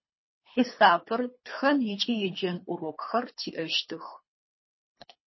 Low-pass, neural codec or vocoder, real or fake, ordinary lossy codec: 7.2 kHz; codec, 24 kHz, 3 kbps, HILCodec; fake; MP3, 24 kbps